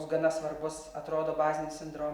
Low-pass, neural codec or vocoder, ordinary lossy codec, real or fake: 19.8 kHz; none; Opus, 64 kbps; real